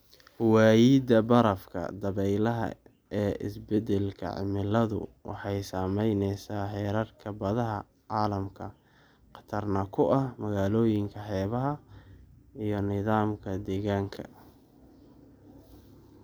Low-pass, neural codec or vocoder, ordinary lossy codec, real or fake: none; none; none; real